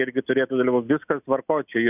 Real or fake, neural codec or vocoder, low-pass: real; none; 3.6 kHz